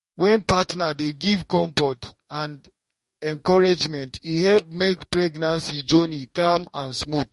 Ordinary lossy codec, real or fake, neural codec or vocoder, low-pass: MP3, 48 kbps; fake; codec, 44.1 kHz, 2.6 kbps, DAC; 14.4 kHz